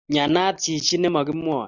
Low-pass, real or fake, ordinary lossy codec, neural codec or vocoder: 7.2 kHz; real; Opus, 64 kbps; none